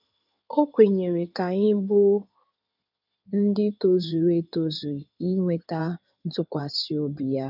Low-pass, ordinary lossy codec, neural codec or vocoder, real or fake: 5.4 kHz; none; codec, 16 kHz in and 24 kHz out, 2.2 kbps, FireRedTTS-2 codec; fake